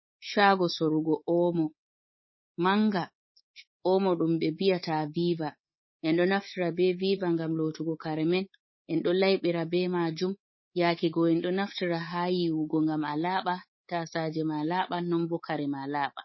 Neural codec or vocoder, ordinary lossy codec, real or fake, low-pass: codec, 24 kHz, 3.1 kbps, DualCodec; MP3, 24 kbps; fake; 7.2 kHz